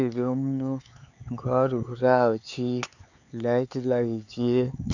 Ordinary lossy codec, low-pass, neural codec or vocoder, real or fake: none; 7.2 kHz; codec, 16 kHz, 4 kbps, X-Codec, HuBERT features, trained on LibriSpeech; fake